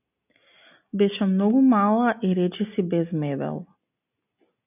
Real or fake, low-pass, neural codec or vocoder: real; 3.6 kHz; none